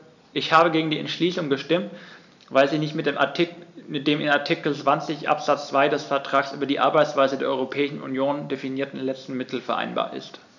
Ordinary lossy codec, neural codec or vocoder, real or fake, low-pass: none; none; real; 7.2 kHz